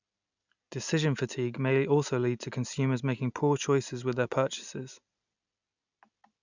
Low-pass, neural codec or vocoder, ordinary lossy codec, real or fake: 7.2 kHz; none; none; real